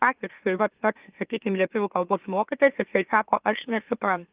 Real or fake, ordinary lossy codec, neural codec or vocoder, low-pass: fake; Opus, 32 kbps; autoencoder, 44.1 kHz, a latent of 192 numbers a frame, MeloTTS; 3.6 kHz